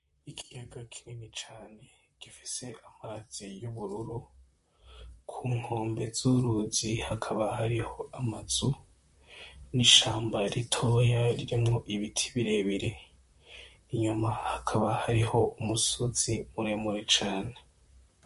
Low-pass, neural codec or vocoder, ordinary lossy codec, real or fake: 14.4 kHz; vocoder, 44.1 kHz, 128 mel bands, Pupu-Vocoder; MP3, 48 kbps; fake